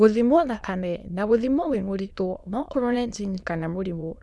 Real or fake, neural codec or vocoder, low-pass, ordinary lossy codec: fake; autoencoder, 22.05 kHz, a latent of 192 numbers a frame, VITS, trained on many speakers; none; none